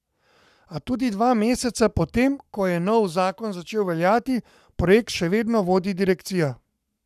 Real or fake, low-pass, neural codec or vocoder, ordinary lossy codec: fake; 14.4 kHz; codec, 44.1 kHz, 7.8 kbps, Pupu-Codec; none